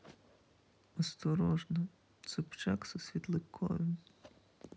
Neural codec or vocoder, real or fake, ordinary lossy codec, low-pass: none; real; none; none